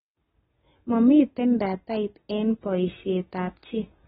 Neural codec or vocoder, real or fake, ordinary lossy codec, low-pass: none; real; AAC, 16 kbps; 19.8 kHz